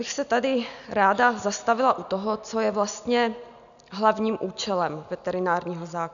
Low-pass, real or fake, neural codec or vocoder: 7.2 kHz; real; none